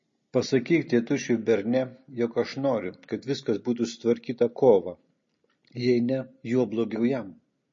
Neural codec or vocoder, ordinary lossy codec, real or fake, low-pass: none; MP3, 32 kbps; real; 7.2 kHz